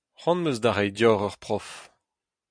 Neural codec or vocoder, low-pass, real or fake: none; 9.9 kHz; real